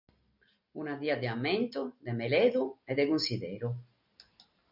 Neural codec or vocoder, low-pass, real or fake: none; 5.4 kHz; real